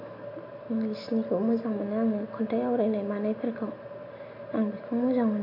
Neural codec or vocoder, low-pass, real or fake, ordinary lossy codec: none; 5.4 kHz; real; AAC, 32 kbps